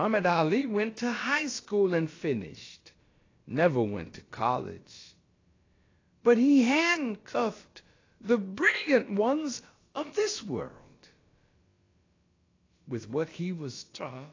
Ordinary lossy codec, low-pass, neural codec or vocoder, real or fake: AAC, 32 kbps; 7.2 kHz; codec, 16 kHz, about 1 kbps, DyCAST, with the encoder's durations; fake